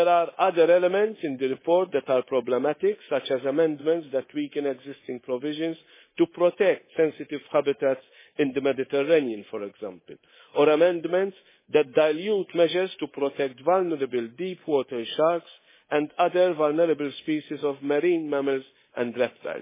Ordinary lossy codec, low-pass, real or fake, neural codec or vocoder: MP3, 16 kbps; 3.6 kHz; fake; codec, 24 kHz, 3.1 kbps, DualCodec